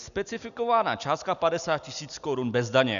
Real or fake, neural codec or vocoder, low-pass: real; none; 7.2 kHz